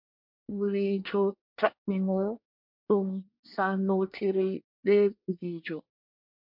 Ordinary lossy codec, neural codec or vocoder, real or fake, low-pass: MP3, 48 kbps; codec, 24 kHz, 1 kbps, SNAC; fake; 5.4 kHz